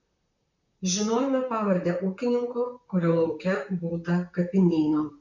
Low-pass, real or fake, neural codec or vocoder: 7.2 kHz; fake; vocoder, 44.1 kHz, 128 mel bands, Pupu-Vocoder